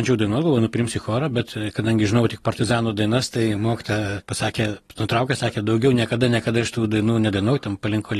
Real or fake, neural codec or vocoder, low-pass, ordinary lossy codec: real; none; 19.8 kHz; AAC, 32 kbps